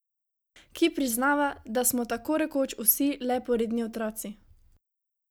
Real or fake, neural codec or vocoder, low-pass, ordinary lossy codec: real; none; none; none